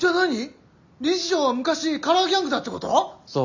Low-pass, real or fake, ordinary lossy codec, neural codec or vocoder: 7.2 kHz; real; none; none